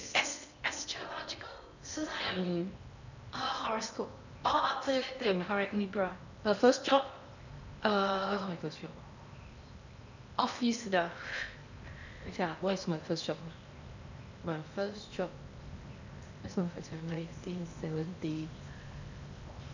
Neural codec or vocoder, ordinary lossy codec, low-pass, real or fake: codec, 16 kHz in and 24 kHz out, 0.8 kbps, FocalCodec, streaming, 65536 codes; none; 7.2 kHz; fake